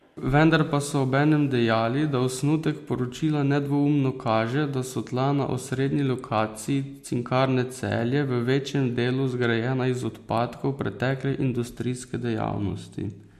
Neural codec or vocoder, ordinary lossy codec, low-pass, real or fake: none; MP3, 64 kbps; 14.4 kHz; real